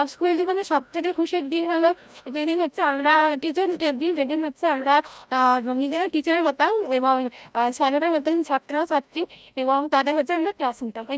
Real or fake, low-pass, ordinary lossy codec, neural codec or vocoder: fake; none; none; codec, 16 kHz, 0.5 kbps, FreqCodec, larger model